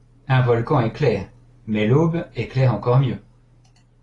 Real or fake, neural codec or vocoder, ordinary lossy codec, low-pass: real; none; AAC, 32 kbps; 10.8 kHz